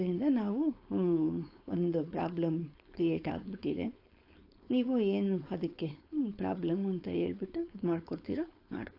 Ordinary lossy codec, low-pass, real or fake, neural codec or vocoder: AAC, 32 kbps; 5.4 kHz; fake; codec, 16 kHz, 4.8 kbps, FACodec